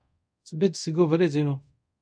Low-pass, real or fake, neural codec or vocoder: 9.9 kHz; fake; codec, 24 kHz, 0.5 kbps, DualCodec